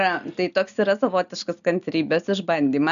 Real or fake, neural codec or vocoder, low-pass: real; none; 7.2 kHz